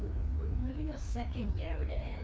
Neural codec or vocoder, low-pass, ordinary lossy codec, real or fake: codec, 16 kHz, 2 kbps, FreqCodec, larger model; none; none; fake